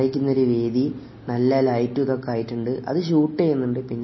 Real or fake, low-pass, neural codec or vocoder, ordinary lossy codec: real; 7.2 kHz; none; MP3, 24 kbps